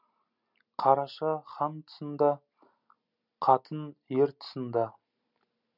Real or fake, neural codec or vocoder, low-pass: real; none; 5.4 kHz